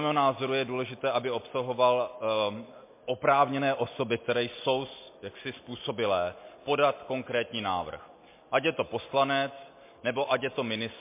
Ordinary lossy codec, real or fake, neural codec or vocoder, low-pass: MP3, 24 kbps; real; none; 3.6 kHz